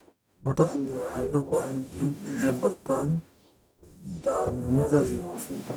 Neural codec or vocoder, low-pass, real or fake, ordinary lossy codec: codec, 44.1 kHz, 0.9 kbps, DAC; none; fake; none